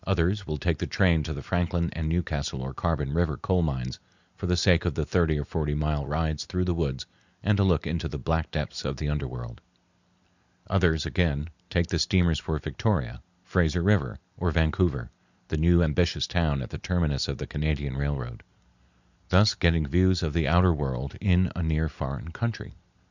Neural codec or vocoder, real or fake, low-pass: none; real; 7.2 kHz